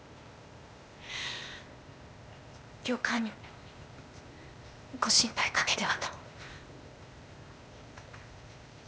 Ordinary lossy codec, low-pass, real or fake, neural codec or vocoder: none; none; fake; codec, 16 kHz, 0.8 kbps, ZipCodec